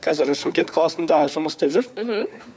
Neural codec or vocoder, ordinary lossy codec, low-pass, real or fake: codec, 16 kHz, 4 kbps, FunCodec, trained on LibriTTS, 50 frames a second; none; none; fake